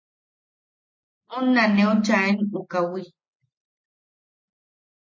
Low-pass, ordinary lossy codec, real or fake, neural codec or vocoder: 7.2 kHz; MP3, 32 kbps; real; none